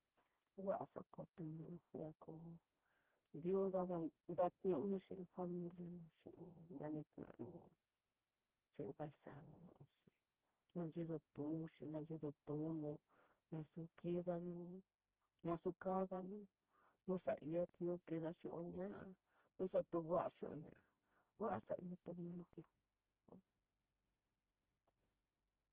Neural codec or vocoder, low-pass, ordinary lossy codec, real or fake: codec, 16 kHz, 1 kbps, FreqCodec, smaller model; 3.6 kHz; Opus, 16 kbps; fake